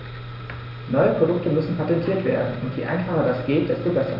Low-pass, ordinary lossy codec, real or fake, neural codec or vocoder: 5.4 kHz; none; real; none